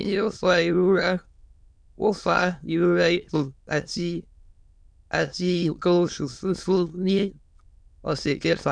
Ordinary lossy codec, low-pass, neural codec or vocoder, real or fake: none; 9.9 kHz; autoencoder, 22.05 kHz, a latent of 192 numbers a frame, VITS, trained on many speakers; fake